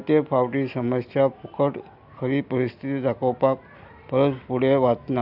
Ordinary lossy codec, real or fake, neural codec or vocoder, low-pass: AAC, 48 kbps; real; none; 5.4 kHz